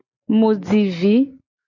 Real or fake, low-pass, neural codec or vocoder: real; 7.2 kHz; none